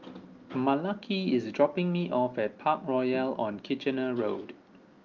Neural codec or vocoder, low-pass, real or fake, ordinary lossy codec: none; 7.2 kHz; real; Opus, 24 kbps